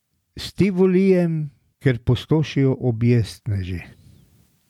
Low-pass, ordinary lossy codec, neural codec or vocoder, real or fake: 19.8 kHz; none; none; real